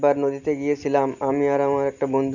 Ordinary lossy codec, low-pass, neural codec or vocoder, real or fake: none; 7.2 kHz; none; real